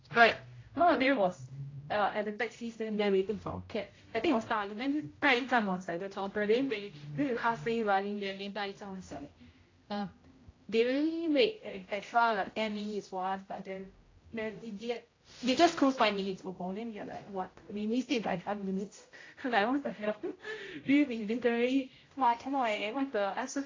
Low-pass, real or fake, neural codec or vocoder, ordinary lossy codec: 7.2 kHz; fake; codec, 16 kHz, 0.5 kbps, X-Codec, HuBERT features, trained on general audio; AAC, 32 kbps